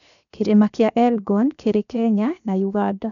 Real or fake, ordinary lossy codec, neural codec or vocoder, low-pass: fake; none; codec, 16 kHz, 0.7 kbps, FocalCodec; 7.2 kHz